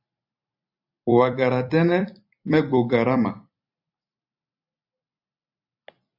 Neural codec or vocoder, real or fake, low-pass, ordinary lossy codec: vocoder, 44.1 kHz, 128 mel bands every 256 samples, BigVGAN v2; fake; 5.4 kHz; AAC, 48 kbps